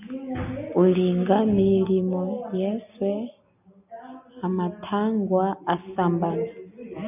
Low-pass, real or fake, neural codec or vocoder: 3.6 kHz; real; none